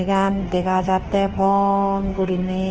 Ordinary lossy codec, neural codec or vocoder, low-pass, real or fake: Opus, 16 kbps; autoencoder, 48 kHz, 32 numbers a frame, DAC-VAE, trained on Japanese speech; 7.2 kHz; fake